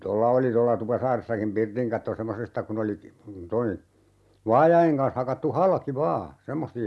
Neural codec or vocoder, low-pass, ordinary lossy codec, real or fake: none; none; none; real